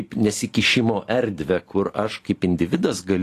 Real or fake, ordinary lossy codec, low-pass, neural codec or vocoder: real; AAC, 48 kbps; 14.4 kHz; none